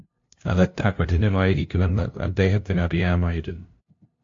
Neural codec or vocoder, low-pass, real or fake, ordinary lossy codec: codec, 16 kHz, 0.5 kbps, FunCodec, trained on LibriTTS, 25 frames a second; 7.2 kHz; fake; AAC, 48 kbps